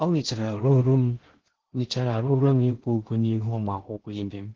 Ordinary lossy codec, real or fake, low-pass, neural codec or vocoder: Opus, 16 kbps; fake; 7.2 kHz; codec, 16 kHz in and 24 kHz out, 0.6 kbps, FocalCodec, streaming, 2048 codes